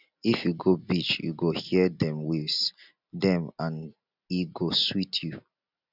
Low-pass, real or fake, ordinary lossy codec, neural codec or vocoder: 5.4 kHz; real; none; none